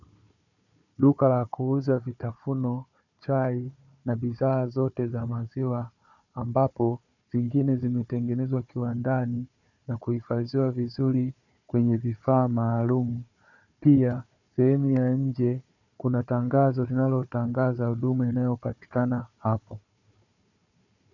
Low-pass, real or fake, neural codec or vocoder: 7.2 kHz; fake; codec, 16 kHz, 4 kbps, FunCodec, trained on Chinese and English, 50 frames a second